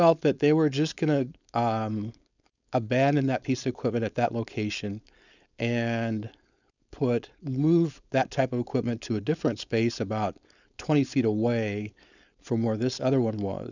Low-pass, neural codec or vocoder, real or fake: 7.2 kHz; codec, 16 kHz, 4.8 kbps, FACodec; fake